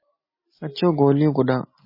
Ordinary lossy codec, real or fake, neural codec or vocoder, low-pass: MP3, 24 kbps; real; none; 5.4 kHz